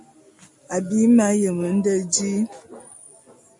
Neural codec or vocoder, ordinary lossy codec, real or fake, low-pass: none; MP3, 64 kbps; real; 10.8 kHz